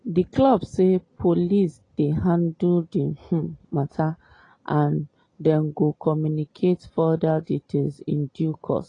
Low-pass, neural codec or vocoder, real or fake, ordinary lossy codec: 9.9 kHz; none; real; AAC, 32 kbps